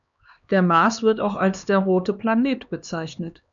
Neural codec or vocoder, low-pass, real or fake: codec, 16 kHz, 2 kbps, X-Codec, HuBERT features, trained on LibriSpeech; 7.2 kHz; fake